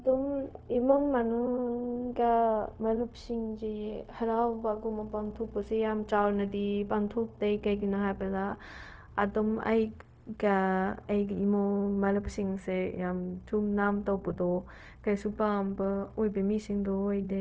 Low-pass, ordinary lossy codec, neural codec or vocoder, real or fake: none; none; codec, 16 kHz, 0.4 kbps, LongCat-Audio-Codec; fake